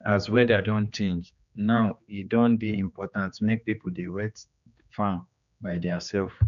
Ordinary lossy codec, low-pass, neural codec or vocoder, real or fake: none; 7.2 kHz; codec, 16 kHz, 2 kbps, X-Codec, HuBERT features, trained on general audio; fake